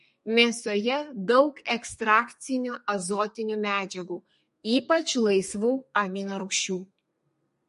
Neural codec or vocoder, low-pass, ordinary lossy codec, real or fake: codec, 32 kHz, 1.9 kbps, SNAC; 14.4 kHz; MP3, 48 kbps; fake